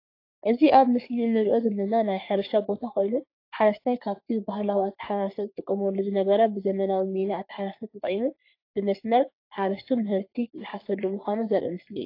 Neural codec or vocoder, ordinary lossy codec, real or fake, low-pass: codec, 44.1 kHz, 3.4 kbps, Pupu-Codec; AAC, 32 kbps; fake; 5.4 kHz